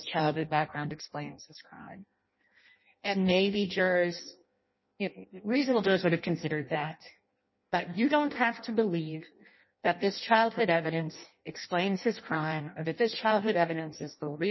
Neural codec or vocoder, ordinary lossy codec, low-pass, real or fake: codec, 16 kHz in and 24 kHz out, 0.6 kbps, FireRedTTS-2 codec; MP3, 24 kbps; 7.2 kHz; fake